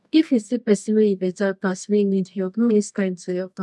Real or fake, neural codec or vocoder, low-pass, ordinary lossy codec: fake; codec, 24 kHz, 0.9 kbps, WavTokenizer, medium music audio release; none; none